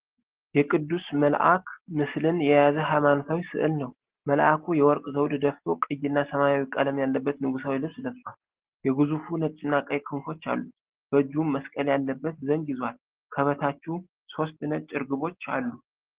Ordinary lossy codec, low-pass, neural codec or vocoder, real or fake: Opus, 16 kbps; 3.6 kHz; none; real